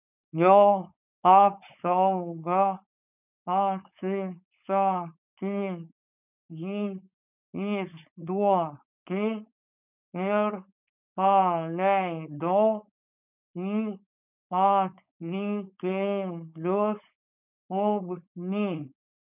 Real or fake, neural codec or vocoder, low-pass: fake; codec, 16 kHz, 4.8 kbps, FACodec; 3.6 kHz